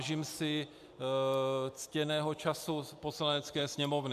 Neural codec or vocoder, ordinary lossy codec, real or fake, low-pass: none; AAC, 96 kbps; real; 14.4 kHz